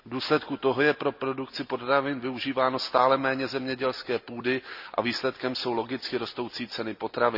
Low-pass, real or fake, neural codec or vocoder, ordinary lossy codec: 5.4 kHz; real; none; none